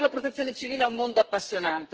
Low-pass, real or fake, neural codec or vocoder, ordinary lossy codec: 7.2 kHz; fake; codec, 32 kHz, 1.9 kbps, SNAC; Opus, 16 kbps